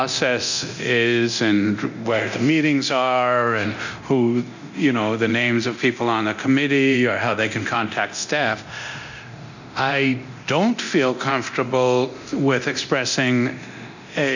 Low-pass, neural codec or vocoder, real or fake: 7.2 kHz; codec, 24 kHz, 0.9 kbps, DualCodec; fake